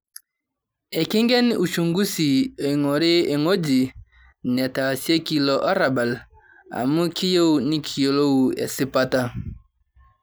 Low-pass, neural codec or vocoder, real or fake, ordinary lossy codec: none; none; real; none